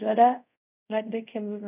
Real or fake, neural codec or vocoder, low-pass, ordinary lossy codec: fake; codec, 24 kHz, 0.5 kbps, DualCodec; 3.6 kHz; none